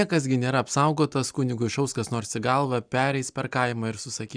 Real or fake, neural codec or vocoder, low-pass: real; none; 9.9 kHz